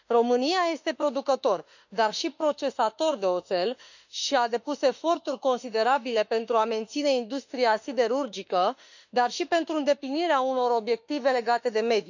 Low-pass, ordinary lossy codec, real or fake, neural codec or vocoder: 7.2 kHz; none; fake; autoencoder, 48 kHz, 32 numbers a frame, DAC-VAE, trained on Japanese speech